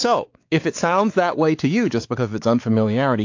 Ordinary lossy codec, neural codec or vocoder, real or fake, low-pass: AAC, 48 kbps; codec, 16 kHz, 4 kbps, X-Codec, WavLM features, trained on Multilingual LibriSpeech; fake; 7.2 kHz